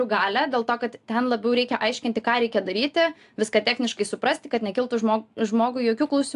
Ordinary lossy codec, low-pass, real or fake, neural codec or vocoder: AAC, 64 kbps; 10.8 kHz; real; none